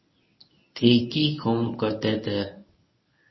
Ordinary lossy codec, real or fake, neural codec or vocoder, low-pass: MP3, 24 kbps; fake; codec, 24 kHz, 0.9 kbps, WavTokenizer, medium speech release version 1; 7.2 kHz